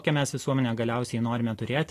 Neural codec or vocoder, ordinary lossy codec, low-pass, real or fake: none; AAC, 48 kbps; 14.4 kHz; real